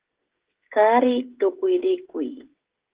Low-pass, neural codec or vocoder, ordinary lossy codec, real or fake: 3.6 kHz; codec, 16 kHz, 16 kbps, FreqCodec, smaller model; Opus, 16 kbps; fake